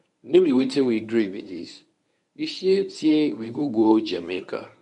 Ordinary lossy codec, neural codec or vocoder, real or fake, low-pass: none; codec, 24 kHz, 0.9 kbps, WavTokenizer, medium speech release version 1; fake; 10.8 kHz